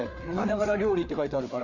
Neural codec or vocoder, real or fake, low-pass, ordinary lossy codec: codec, 16 kHz, 4 kbps, FreqCodec, larger model; fake; 7.2 kHz; none